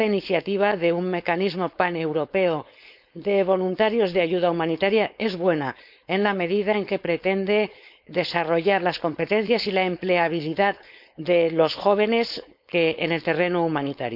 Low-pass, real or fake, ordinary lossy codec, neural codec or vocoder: 5.4 kHz; fake; none; codec, 16 kHz, 4.8 kbps, FACodec